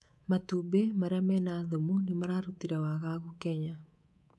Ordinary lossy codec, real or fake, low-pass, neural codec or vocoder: none; fake; none; codec, 24 kHz, 3.1 kbps, DualCodec